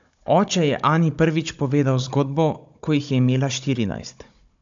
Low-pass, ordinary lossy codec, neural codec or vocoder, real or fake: 7.2 kHz; AAC, 64 kbps; codec, 16 kHz, 16 kbps, FunCodec, trained on Chinese and English, 50 frames a second; fake